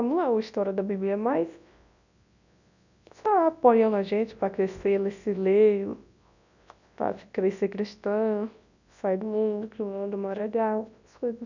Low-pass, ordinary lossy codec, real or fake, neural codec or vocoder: 7.2 kHz; none; fake; codec, 24 kHz, 0.9 kbps, WavTokenizer, large speech release